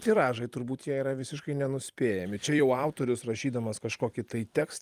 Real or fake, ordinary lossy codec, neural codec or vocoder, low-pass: fake; Opus, 32 kbps; vocoder, 44.1 kHz, 128 mel bands every 256 samples, BigVGAN v2; 14.4 kHz